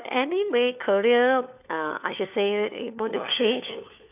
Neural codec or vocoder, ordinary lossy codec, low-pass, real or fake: codec, 16 kHz, 4 kbps, FunCodec, trained on LibriTTS, 50 frames a second; none; 3.6 kHz; fake